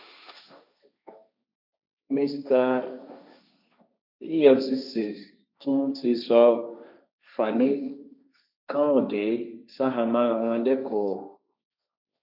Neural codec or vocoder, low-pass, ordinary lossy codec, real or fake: codec, 16 kHz, 1.1 kbps, Voila-Tokenizer; 5.4 kHz; none; fake